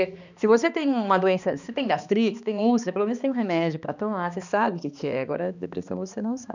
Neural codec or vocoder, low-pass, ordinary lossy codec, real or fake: codec, 16 kHz, 2 kbps, X-Codec, HuBERT features, trained on balanced general audio; 7.2 kHz; none; fake